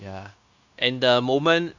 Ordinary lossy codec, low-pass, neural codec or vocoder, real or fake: Opus, 64 kbps; 7.2 kHz; codec, 16 kHz, 0.9 kbps, LongCat-Audio-Codec; fake